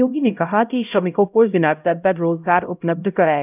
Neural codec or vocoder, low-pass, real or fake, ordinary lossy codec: codec, 16 kHz, 0.5 kbps, X-Codec, HuBERT features, trained on LibriSpeech; 3.6 kHz; fake; none